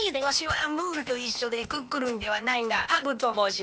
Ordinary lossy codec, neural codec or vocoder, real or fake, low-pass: none; codec, 16 kHz, 0.8 kbps, ZipCodec; fake; none